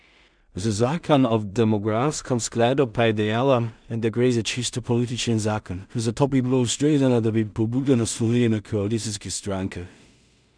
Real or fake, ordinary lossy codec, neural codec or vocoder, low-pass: fake; none; codec, 16 kHz in and 24 kHz out, 0.4 kbps, LongCat-Audio-Codec, two codebook decoder; 9.9 kHz